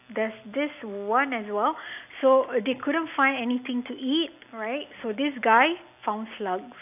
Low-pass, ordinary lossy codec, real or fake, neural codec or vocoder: 3.6 kHz; AAC, 32 kbps; real; none